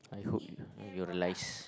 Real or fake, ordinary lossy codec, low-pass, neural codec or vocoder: real; none; none; none